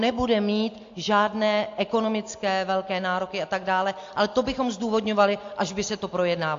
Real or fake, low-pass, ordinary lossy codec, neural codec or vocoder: real; 7.2 kHz; AAC, 48 kbps; none